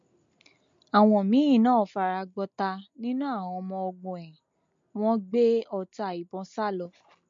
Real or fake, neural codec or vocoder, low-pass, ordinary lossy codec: real; none; 7.2 kHz; MP3, 48 kbps